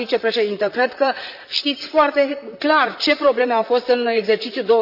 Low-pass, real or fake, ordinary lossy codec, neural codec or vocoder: 5.4 kHz; fake; none; vocoder, 44.1 kHz, 128 mel bands, Pupu-Vocoder